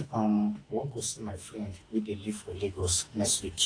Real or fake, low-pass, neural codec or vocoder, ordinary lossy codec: fake; 9.9 kHz; autoencoder, 48 kHz, 32 numbers a frame, DAC-VAE, trained on Japanese speech; AAC, 32 kbps